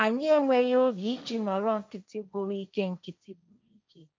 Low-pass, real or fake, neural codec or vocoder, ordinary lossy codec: none; fake; codec, 16 kHz, 1.1 kbps, Voila-Tokenizer; none